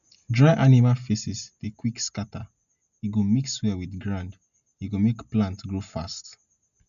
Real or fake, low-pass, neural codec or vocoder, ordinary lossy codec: real; 7.2 kHz; none; none